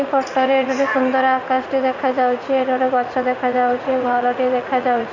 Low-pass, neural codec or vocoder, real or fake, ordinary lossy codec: 7.2 kHz; vocoder, 44.1 kHz, 80 mel bands, Vocos; fake; none